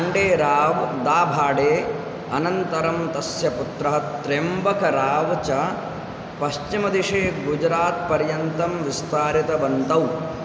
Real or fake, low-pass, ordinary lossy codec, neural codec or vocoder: real; none; none; none